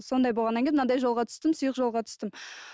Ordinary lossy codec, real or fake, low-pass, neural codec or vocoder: none; real; none; none